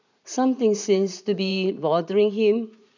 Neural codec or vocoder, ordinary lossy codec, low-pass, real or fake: vocoder, 44.1 kHz, 80 mel bands, Vocos; none; 7.2 kHz; fake